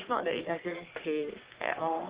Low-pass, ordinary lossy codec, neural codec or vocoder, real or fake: 3.6 kHz; Opus, 16 kbps; codec, 44.1 kHz, 3.4 kbps, Pupu-Codec; fake